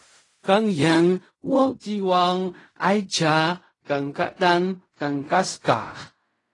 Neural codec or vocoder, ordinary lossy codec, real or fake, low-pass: codec, 16 kHz in and 24 kHz out, 0.4 kbps, LongCat-Audio-Codec, fine tuned four codebook decoder; AAC, 32 kbps; fake; 10.8 kHz